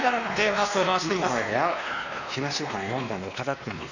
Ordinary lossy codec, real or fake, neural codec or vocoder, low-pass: none; fake; codec, 16 kHz, 2 kbps, X-Codec, WavLM features, trained on Multilingual LibriSpeech; 7.2 kHz